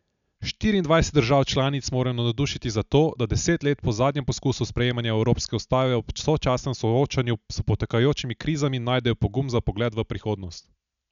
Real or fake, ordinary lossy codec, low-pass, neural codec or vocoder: real; none; 7.2 kHz; none